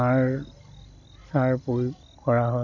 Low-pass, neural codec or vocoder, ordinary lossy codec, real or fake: 7.2 kHz; none; none; real